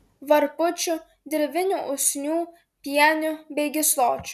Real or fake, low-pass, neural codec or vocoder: real; 14.4 kHz; none